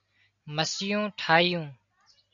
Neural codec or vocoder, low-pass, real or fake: none; 7.2 kHz; real